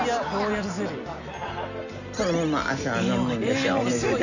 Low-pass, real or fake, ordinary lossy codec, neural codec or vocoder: 7.2 kHz; real; none; none